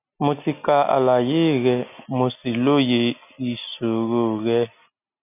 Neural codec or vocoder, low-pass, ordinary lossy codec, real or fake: none; 3.6 kHz; none; real